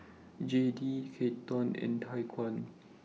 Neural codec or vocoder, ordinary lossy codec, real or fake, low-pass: none; none; real; none